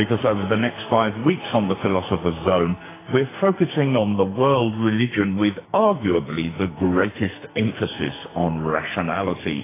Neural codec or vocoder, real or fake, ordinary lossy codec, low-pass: codec, 44.1 kHz, 2.6 kbps, SNAC; fake; AAC, 16 kbps; 3.6 kHz